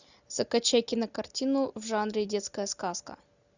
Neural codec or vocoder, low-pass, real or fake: none; 7.2 kHz; real